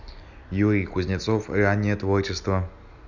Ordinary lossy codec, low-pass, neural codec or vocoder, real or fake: none; 7.2 kHz; none; real